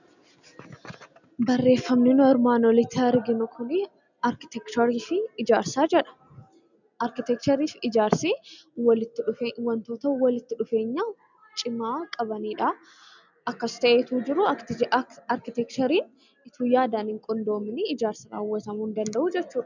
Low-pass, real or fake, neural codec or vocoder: 7.2 kHz; real; none